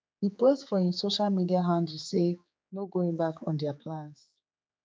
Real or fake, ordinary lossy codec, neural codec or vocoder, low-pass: fake; none; codec, 16 kHz, 4 kbps, X-Codec, HuBERT features, trained on general audio; none